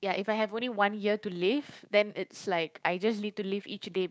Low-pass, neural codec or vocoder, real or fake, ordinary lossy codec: none; codec, 16 kHz, 6 kbps, DAC; fake; none